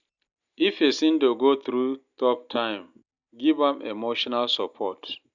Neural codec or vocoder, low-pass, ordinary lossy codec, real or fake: none; 7.2 kHz; none; real